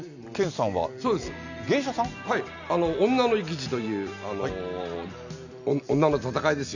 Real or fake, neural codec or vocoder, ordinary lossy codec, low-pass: real; none; none; 7.2 kHz